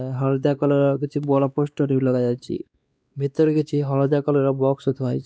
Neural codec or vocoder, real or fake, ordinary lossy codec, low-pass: codec, 16 kHz, 2 kbps, X-Codec, WavLM features, trained on Multilingual LibriSpeech; fake; none; none